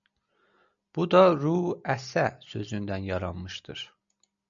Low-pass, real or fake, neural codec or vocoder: 7.2 kHz; real; none